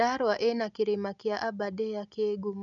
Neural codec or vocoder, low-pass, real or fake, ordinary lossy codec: none; 7.2 kHz; real; none